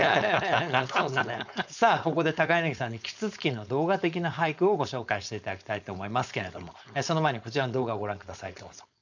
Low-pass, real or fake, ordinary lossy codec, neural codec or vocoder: 7.2 kHz; fake; none; codec, 16 kHz, 4.8 kbps, FACodec